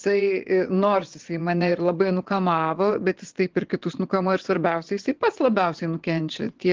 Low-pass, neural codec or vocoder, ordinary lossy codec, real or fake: 7.2 kHz; vocoder, 22.05 kHz, 80 mel bands, WaveNeXt; Opus, 16 kbps; fake